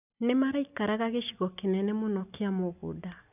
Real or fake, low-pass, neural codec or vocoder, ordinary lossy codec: real; 3.6 kHz; none; none